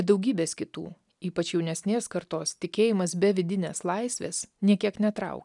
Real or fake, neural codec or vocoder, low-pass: real; none; 10.8 kHz